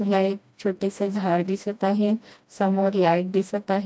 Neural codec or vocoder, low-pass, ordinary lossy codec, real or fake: codec, 16 kHz, 1 kbps, FreqCodec, smaller model; none; none; fake